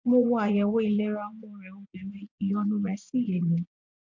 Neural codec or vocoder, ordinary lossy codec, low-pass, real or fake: vocoder, 24 kHz, 100 mel bands, Vocos; MP3, 64 kbps; 7.2 kHz; fake